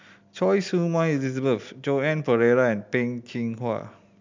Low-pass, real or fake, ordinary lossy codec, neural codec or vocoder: 7.2 kHz; real; MP3, 64 kbps; none